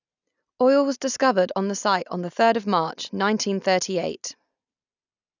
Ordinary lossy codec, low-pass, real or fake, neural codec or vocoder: none; 7.2 kHz; real; none